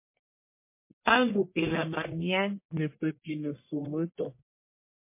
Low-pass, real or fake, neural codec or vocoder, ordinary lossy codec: 3.6 kHz; fake; codec, 44.1 kHz, 1.7 kbps, Pupu-Codec; MP3, 24 kbps